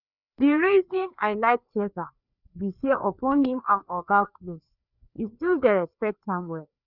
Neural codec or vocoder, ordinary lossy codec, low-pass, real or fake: codec, 16 kHz, 2 kbps, FreqCodec, larger model; none; 5.4 kHz; fake